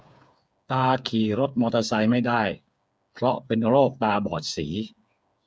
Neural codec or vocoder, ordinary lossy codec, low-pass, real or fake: codec, 16 kHz, 8 kbps, FreqCodec, smaller model; none; none; fake